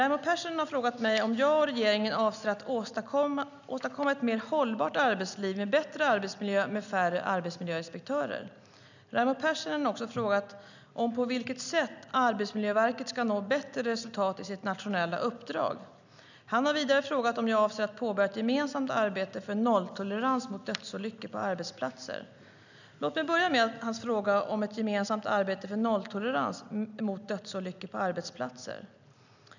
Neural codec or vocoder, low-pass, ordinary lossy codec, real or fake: none; 7.2 kHz; none; real